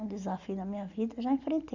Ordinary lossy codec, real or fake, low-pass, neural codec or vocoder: none; real; 7.2 kHz; none